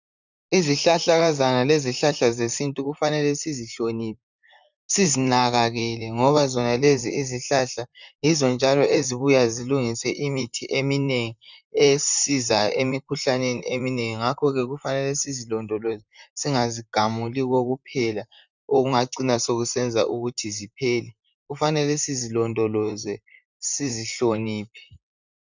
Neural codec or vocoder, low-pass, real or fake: vocoder, 44.1 kHz, 128 mel bands, Pupu-Vocoder; 7.2 kHz; fake